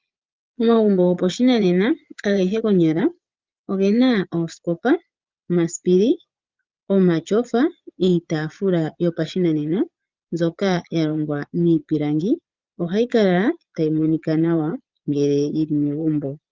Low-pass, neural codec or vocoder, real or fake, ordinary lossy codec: 7.2 kHz; vocoder, 22.05 kHz, 80 mel bands, Vocos; fake; Opus, 32 kbps